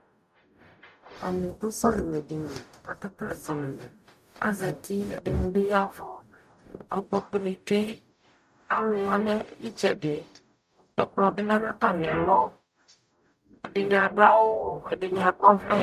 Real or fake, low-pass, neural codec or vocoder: fake; 14.4 kHz; codec, 44.1 kHz, 0.9 kbps, DAC